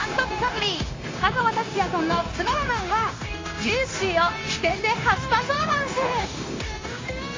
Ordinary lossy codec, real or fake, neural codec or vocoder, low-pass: AAC, 32 kbps; fake; codec, 16 kHz, 0.9 kbps, LongCat-Audio-Codec; 7.2 kHz